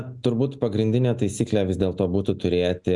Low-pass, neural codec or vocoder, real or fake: 10.8 kHz; none; real